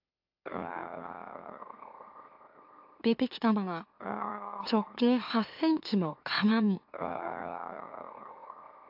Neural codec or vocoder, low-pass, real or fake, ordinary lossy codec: autoencoder, 44.1 kHz, a latent of 192 numbers a frame, MeloTTS; 5.4 kHz; fake; AAC, 48 kbps